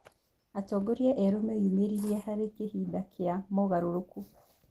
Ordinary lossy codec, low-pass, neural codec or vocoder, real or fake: Opus, 16 kbps; 14.4 kHz; none; real